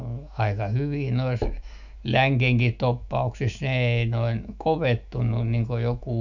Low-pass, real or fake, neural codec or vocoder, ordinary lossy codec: 7.2 kHz; fake; autoencoder, 48 kHz, 128 numbers a frame, DAC-VAE, trained on Japanese speech; none